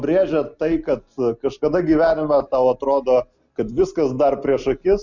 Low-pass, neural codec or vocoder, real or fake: 7.2 kHz; none; real